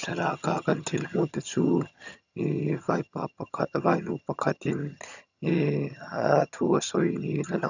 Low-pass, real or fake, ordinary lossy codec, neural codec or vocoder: 7.2 kHz; fake; none; vocoder, 22.05 kHz, 80 mel bands, HiFi-GAN